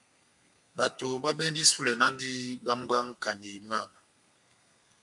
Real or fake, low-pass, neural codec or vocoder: fake; 10.8 kHz; codec, 32 kHz, 1.9 kbps, SNAC